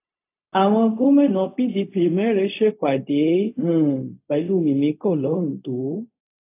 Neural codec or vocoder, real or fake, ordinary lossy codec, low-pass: codec, 16 kHz, 0.4 kbps, LongCat-Audio-Codec; fake; AAC, 24 kbps; 3.6 kHz